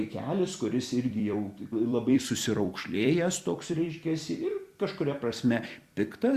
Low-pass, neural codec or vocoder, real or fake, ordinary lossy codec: 14.4 kHz; vocoder, 44.1 kHz, 128 mel bands every 256 samples, BigVGAN v2; fake; Opus, 64 kbps